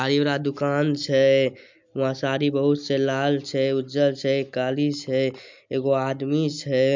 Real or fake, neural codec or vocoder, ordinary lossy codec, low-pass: real; none; MP3, 64 kbps; 7.2 kHz